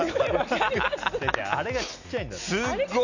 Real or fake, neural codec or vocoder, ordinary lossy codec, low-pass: real; none; none; 7.2 kHz